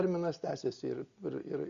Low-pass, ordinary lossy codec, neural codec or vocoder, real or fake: 7.2 kHz; Opus, 64 kbps; none; real